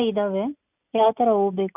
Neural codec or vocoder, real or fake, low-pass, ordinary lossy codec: none; real; 3.6 kHz; none